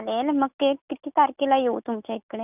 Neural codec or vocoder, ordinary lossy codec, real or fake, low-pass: none; none; real; 3.6 kHz